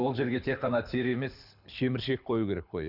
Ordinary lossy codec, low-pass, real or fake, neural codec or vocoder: none; 5.4 kHz; fake; codec, 24 kHz, 6 kbps, HILCodec